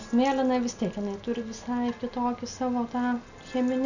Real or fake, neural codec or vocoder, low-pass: real; none; 7.2 kHz